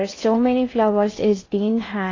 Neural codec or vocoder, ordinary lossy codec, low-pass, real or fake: codec, 16 kHz in and 24 kHz out, 0.6 kbps, FocalCodec, streaming, 4096 codes; AAC, 32 kbps; 7.2 kHz; fake